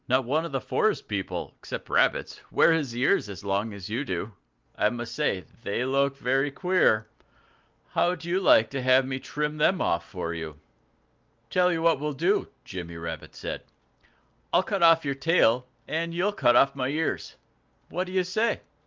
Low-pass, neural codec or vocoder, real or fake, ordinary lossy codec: 7.2 kHz; none; real; Opus, 24 kbps